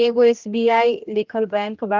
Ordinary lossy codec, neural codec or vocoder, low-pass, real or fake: Opus, 32 kbps; codec, 16 kHz, 1 kbps, X-Codec, HuBERT features, trained on general audio; 7.2 kHz; fake